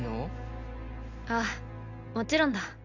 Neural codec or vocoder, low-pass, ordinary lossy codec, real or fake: none; 7.2 kHz; none; real